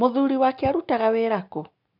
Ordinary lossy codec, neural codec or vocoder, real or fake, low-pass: none; none; real; 5.4 kHz